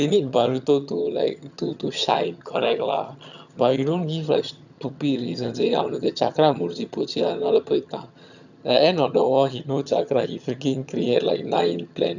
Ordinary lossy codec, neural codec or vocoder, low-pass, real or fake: none; vocoder, 22.05 kHz, 80 mel bands, HiFi-GAN; 7.2 kHz; fake